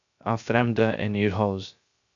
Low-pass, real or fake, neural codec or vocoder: 7.2 kHz; fake; codec, 16 kHz, 0.3 kbps, FocalCodec